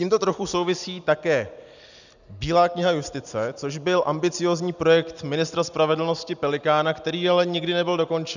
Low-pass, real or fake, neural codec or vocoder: 7.2 kHz; real; none